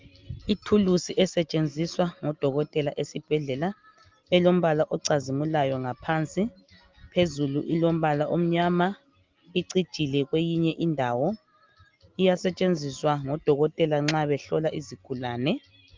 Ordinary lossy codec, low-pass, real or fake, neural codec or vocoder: Opus, 32 kbps; 7.2 kHz; real; none